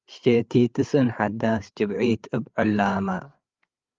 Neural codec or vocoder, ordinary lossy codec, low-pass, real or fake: codec, 16 kHz, 8 kbps, FreqCodec, larger model; Opus, 32 kbps; 7.2 kHz; fake